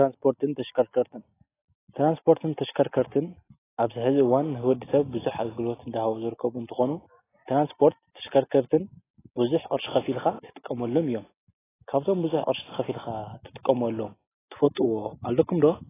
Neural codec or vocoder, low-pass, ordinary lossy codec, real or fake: none; 3.6 kHz; AAC, 16 kbps; real